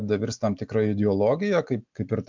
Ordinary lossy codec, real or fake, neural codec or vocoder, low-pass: MP3, 64 kbps; real; none; 7.2 kHz